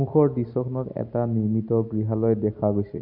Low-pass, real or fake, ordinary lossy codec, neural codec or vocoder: 5.4 kHz; real; none; none